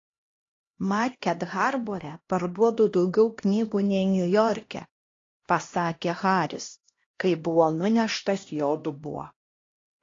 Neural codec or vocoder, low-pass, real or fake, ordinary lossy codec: codec, 16 kHz, 1 kbps, X-Codec, HuBERT features, trained on LibriSpeech; 7.2 kHz; fake; AAC, 32 kbps